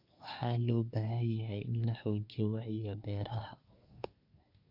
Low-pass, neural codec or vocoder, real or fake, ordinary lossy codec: 5.4 kHz; codec, 24 kHz, 1 kbps, SNAC; fake; none